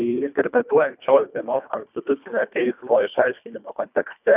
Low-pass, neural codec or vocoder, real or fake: 3.6 kHz; codec, 24 kHz, 1.5 kbps, HILCodec; fake